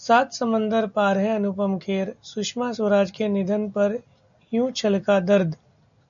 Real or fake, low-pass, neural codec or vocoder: real; 7.2 kHz; none